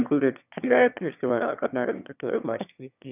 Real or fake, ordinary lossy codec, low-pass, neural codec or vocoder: fake; none; 3.6 kHz; autoencoder, 22.05 kHz, a latent of 192 numbers a frame, VITS, trained on one speaker